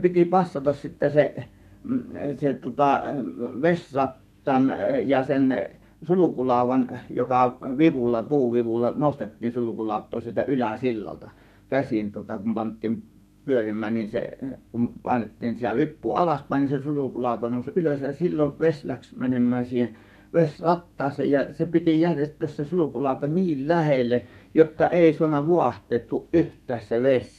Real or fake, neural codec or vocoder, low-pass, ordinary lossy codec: fake; codec, 32 kHz, 1.9 kbps, SNAC; 14.4 kHz; none